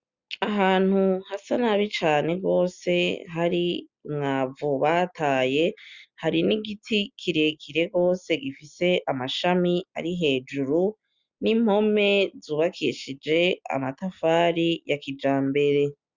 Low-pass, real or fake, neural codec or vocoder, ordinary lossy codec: 7.2 kHz; real; none; Opus, 64 kbps